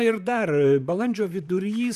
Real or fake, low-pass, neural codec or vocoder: fake; 14.4 kHz; vocoder, 44.1 kHz, 128 mel bands, Pupu-Vocoder